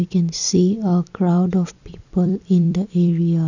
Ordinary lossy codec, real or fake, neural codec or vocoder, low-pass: none; fake; vocoder, 44.1 kHz, 128 mel bands every 256 samples, BigVGAN v2; 7.2 kHz